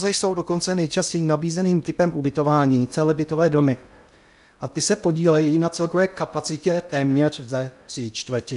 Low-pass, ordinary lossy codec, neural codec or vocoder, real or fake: 10.8 kHz; AAC, 96 kbps; codec, 16 kHz in and 24 kHz out, 0.6 kbps, FocalCodec, streaming, 2048 codes; fake